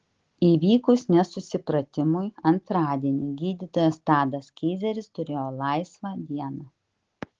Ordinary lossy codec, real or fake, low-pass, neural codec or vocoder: Opus, 16 kbps; real; 7.2 kHz; none